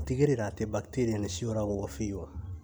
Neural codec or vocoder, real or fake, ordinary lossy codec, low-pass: none; real; none; none